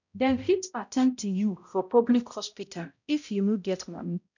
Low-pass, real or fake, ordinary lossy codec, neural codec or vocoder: 7.2 kHz; fake; none; codec, 16 kHz, 0.5 kbps, X-Codec, HuBERT features, trained on balanced general audio